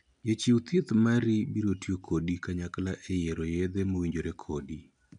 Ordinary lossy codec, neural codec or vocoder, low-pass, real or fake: none; none; 9.9 kHz; real